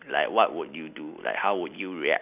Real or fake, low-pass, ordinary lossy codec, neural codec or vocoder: real; 3.6 kHz; none; none